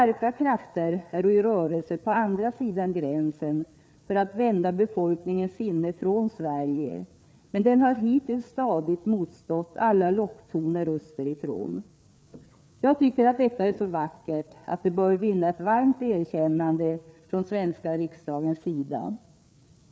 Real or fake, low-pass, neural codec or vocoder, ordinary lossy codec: fake; none; codec, 16 kHz, 4 kbps, FreqCodec, larger model; none